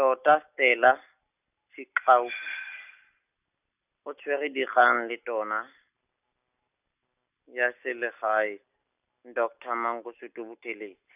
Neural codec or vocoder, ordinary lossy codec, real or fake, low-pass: none; none; real; 3.6 kHz